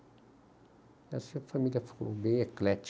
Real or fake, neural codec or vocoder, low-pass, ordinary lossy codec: real; none; none; none